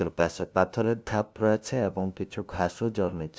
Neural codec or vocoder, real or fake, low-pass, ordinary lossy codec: codec, 16 kHz, 0.5 kbps, FunCodec, trained on LibriTTS, 25 frames a second; fake; none; none